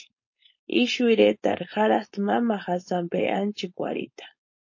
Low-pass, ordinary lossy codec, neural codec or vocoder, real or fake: 7.2 kHz; MP3, 32 kbps; codec, 16 kHz, 4.8 kbps, FACodec; fake